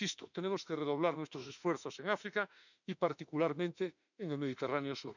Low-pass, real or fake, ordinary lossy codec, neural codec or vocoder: 7.2 kHz; fake; none; autoencoder, 48 kHz, 32 numbers a frame, DAC-VAE, trained on Japanese speech